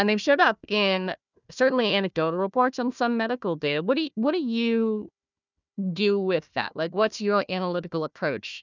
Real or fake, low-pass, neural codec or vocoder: fake; 7.2 kHz; codec, 16 kHz, 1 kbps, FunCodec, trained on Chinese and English, 50 frames a second